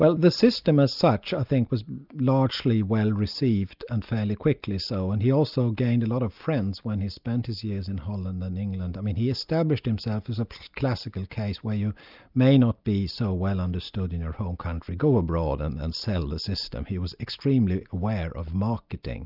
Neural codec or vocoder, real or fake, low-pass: none; real; 5.4 kHz